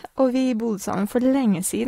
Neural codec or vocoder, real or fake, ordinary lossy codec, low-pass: none; real; AAC, 48 kbps; 19.8 kHz